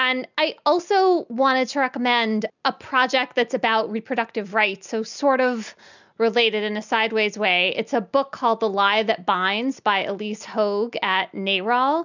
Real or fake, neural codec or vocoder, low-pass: real; none; 7.2 kHz